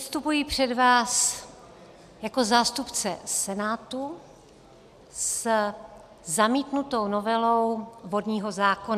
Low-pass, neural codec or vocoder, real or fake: 14.4 kHz; none; real